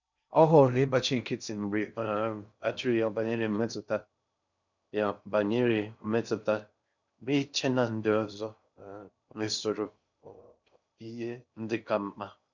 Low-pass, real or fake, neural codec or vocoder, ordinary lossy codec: 7.2 kHz; fake; codec, 16 kHz in and 24 kHz out, 0.6 kbps, FocalCodec, streaming, 2048 codes; none